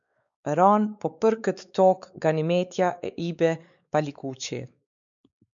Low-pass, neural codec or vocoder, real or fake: 7.2 kHz; codec, 16 kHz, 4 kbps, X-Codec, WavLM features, trained on Multilingual LibriSpeech; fake